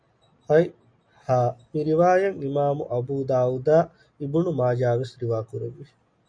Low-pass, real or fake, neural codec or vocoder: 9.9 kHz; real; none